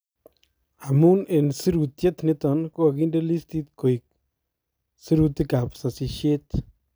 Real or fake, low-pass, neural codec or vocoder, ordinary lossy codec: real; none; none; none